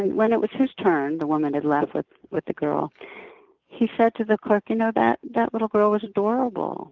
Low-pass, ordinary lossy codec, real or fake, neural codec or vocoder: 7.2 kHz; Opus, 32 kbps; real; none